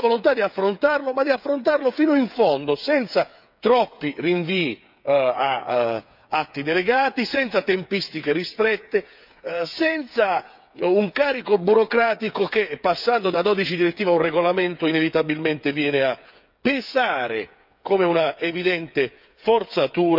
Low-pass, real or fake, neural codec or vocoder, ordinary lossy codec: 5.4 kHz; fake; codec, 16 kHz, 8 kbps, FreqCodec, smaller model; none